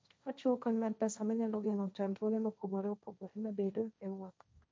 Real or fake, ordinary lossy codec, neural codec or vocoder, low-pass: fake; none; codec, 16 kHz, 1.1 kbps, Voila-Tokenizer; none